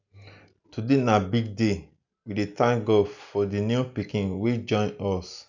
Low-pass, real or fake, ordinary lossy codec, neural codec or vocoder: 7.2 kHz; real; none; none